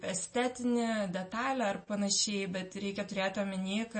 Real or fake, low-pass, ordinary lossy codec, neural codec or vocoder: real; 9.9 kHz; MP3, 32 kbps; none